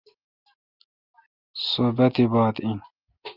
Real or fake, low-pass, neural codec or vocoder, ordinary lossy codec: real; 5.4 kHz; none; Opus, 24 kbps